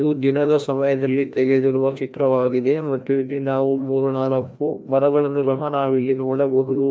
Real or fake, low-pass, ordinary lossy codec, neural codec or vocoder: fake; none; none; codec, 16 kHz, 1 kbps, FreqCodec, larger model